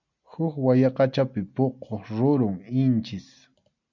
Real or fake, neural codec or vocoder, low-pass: real; none; 7.2 kHz